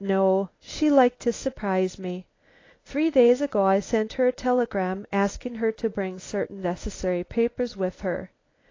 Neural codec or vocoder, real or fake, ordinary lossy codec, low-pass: codec, 24 kHz, 0.9 kbps, WavTokenizer, medium speech release version 1; fake; AAC, 32 kbps; 7.2 kHz